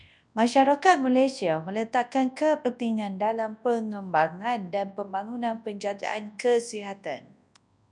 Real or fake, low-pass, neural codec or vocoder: fake; 10.8 kHz; codec, 24 kHz, 0.9 kbps, WavTokenizer, large speech release